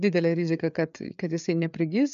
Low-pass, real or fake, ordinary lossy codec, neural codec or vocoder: 7.2 kHz; fake; MP3, 96 kbps; codec, 16 kHz, 4 kbps, FreqCodec, larger model